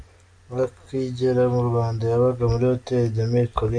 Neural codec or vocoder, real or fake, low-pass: none; real; 9.9 kHz